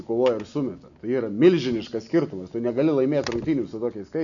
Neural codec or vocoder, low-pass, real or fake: none; 7.2 kHz; real